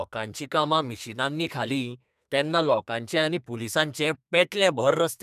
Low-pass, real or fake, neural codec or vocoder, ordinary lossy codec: 14.4 kHz; fake; codec, 32 kHz, 1.9 kbps, SNAC; none